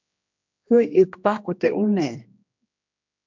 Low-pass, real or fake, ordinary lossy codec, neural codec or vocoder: 7.2 kHz; fake; MP3, 64 kbps; codec, 16 kHz, 1 kbps, X-Codec, HuBERT features, trained on general audio